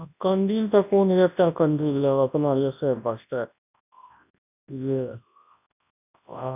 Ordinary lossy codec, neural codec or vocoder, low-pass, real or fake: none; codec, 24 kHz, 0.9 kbps, WavTokenizer, large speech release; 3.6 kHz; fake